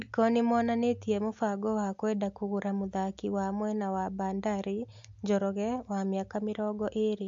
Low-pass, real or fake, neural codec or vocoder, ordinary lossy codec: 7.2 kHz; real; none; AAC, 64 kbps